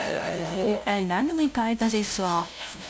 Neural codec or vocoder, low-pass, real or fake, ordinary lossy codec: codec, 16 kHz, 0.5 kbps, FunCodec, trained on LibriTTS, 25 frames a second; none; fake; none